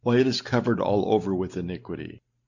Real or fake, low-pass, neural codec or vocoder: real; 7.2 kHz; none